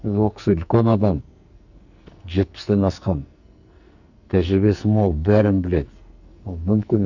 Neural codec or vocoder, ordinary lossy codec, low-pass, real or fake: codec, 44.1 kHz, 2.6 kbps, SNAC; none; 7.2 kHz; fake